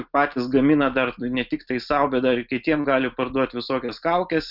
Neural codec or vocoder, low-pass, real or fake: none; 5.4 kHz; real